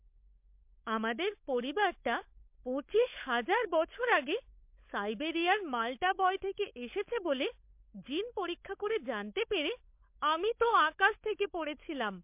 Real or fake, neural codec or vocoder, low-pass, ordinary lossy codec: fake; codec, 16 kHz, 16 kbps, FunCodec, trained on LibriTTS, 50 frames a second; 3.6 kHz; MP3, 32 kbps